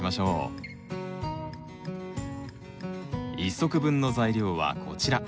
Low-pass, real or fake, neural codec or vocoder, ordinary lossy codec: none; real; none; none